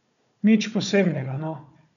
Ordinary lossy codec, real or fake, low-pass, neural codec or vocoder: none; fake; 7.2 kHz; codec, 16 kHz, 4 kbps, FunCodec, trained on Chinese and English, 50 frames a second